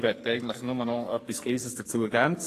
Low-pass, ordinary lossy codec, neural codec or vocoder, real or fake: 14.4 kHz; AAC, 48 kbps; codec, 44.1 kHz, 2.6 kbps, SNAC; fake